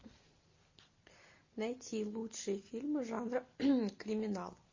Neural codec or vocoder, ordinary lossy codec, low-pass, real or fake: none; MP3, 32 kbps; 7.2 kHz; real